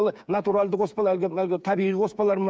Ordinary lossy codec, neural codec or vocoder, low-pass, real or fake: none; codec, 16 kHz, 8 kbps, FreqCodec, smaller model; none; fake